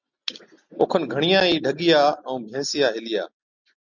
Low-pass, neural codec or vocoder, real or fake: 7.2 kHz; none; real